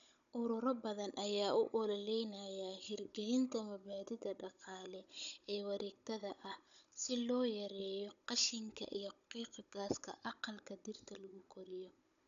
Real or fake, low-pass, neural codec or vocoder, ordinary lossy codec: fake; 7.2 kHz; codec, 16 kHz, 16 kbps, FunCodec, trained on Chinese and English, 50 frames a second; none